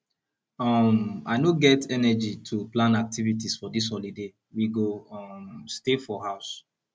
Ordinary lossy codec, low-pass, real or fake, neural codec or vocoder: none; none; real; none